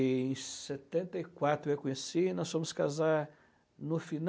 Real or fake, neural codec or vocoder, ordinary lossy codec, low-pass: real; none; none; none